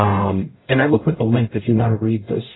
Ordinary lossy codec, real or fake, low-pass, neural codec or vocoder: AAC, 16 kbps; fake; 7.2 kHz; codec, 44.1 kHz, 0.9 kbps, DAC